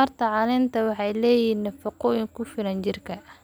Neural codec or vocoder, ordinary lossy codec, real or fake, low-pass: none; none; real; none